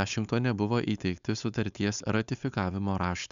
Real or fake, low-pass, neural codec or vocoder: fake; 7.2 kHz; codec, 16 kHz, 4.8 kbps, FACodec